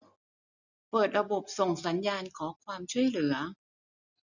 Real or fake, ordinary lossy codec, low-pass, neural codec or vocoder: real; none; 7.2 kHz; none